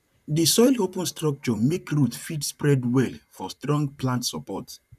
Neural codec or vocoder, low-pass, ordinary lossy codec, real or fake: vocoder, 44.1 kHz, 128 mel bands, Pupu-Vocoder; 14.4 kHz; none; fake